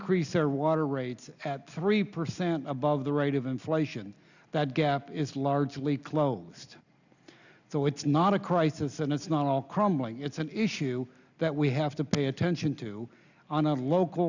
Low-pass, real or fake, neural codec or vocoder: 7.2 kHz; real; none